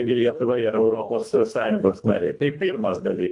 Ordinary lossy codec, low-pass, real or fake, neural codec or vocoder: AAC, 64 kbps; 10.8 kHz; fake; codec, 24 kHz, 1.5 kbps, HILCodec